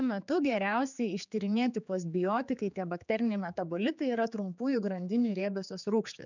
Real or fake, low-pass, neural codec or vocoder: fake; 7.2 kHz; codec, 16 kHz, 4 kbps, X-Codec, HuBERT features, trained on general audio